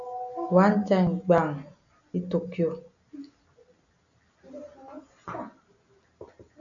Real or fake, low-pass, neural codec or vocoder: real; 7.2 kHz; none